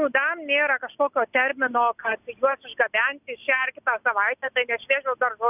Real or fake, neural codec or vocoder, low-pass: real; none; 3.6 kHz